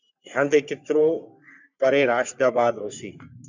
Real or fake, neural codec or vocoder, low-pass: fake; codec, 44.1 kHz, 3.4 kbps, Pupu-Codec; 7.2 kHz